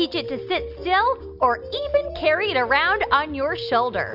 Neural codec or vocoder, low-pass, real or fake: none; 5.4 kHz; real